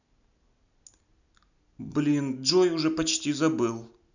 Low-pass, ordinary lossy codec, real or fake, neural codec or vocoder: 7.2 kHz; none; real; none